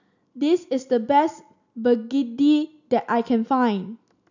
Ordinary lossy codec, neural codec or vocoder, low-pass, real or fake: none; none; 7.2 kHz; real